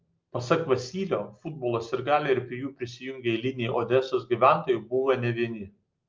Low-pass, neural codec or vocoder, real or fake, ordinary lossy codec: 7.2 kHz; none; real; Opus, 32 kbps